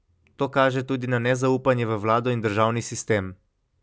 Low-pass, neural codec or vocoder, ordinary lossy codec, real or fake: none; none; none; real